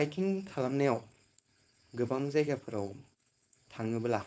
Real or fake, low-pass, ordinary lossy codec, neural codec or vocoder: fake; none; none; codec, 16 kHz, 4.8 kbps, FACodec